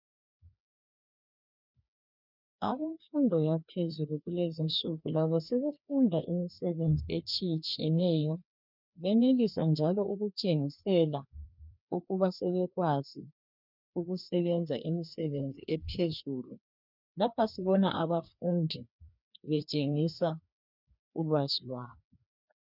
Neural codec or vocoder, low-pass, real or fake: codec, 16 kHz, 2 kbps, FreqCodec, larger model; 5.4 kHz; fake